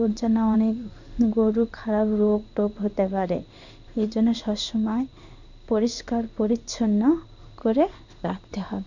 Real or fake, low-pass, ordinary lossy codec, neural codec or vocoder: fake; 7.2 kHz; none; codec, 16 kHz in and 24 kHz out, 1 kbps, XY-Tokenizer